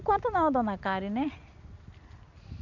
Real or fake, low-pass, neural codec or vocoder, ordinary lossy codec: real; 7.2 kHz; none; none